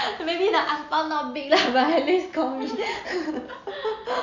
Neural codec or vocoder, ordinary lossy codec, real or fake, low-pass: none; none; real; 7.2 kHz